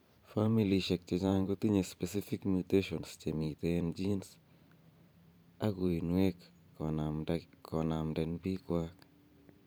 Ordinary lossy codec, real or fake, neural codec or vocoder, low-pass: none; fake; vocoder, 44.1 kHz, 128 mel bands every 512 samples, BigVGAN v2; none